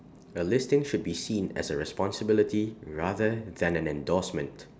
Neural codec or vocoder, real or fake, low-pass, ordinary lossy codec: none; real; none; none